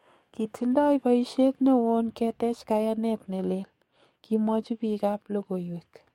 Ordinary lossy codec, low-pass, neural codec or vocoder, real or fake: MP3, 64 kbps; 19.8 kHz; codec, 44.1 kHz, 7.8 kbps, DAC; fake